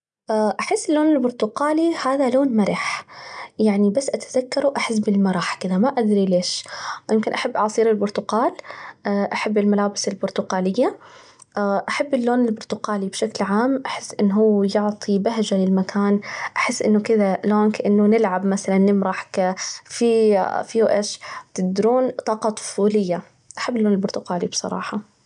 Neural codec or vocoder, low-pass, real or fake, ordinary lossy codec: none; 9.9 kHz; real; MP3, 96 kbps